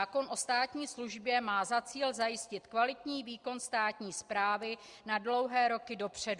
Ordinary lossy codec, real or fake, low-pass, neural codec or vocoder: Opus, 64 kbps; real; 10.8 kHz; none